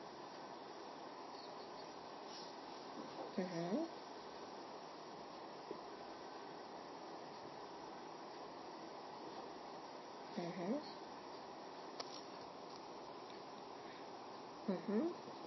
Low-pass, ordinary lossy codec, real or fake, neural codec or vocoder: 7.2 kHz; MP3, 24 kbps; real; none